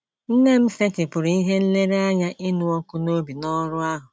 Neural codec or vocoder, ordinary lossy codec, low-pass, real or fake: none; none; none; real